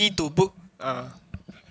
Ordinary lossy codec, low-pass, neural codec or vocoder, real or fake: none; none; none; real